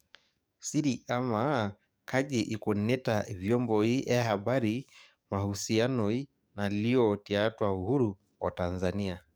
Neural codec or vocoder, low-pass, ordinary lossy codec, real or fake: codec, 44.1 kHz, 7.8 kbps, DAC; none; none; fake